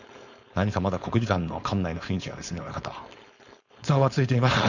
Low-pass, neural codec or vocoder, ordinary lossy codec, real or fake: 7.2 kHz; codec, 16 kHz, 4.8 kbps, FACodec; none; fake